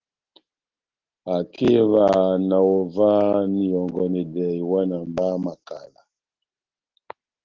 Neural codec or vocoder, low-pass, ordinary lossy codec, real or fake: none; 7.2 kHz; Opus, 16 kbps; real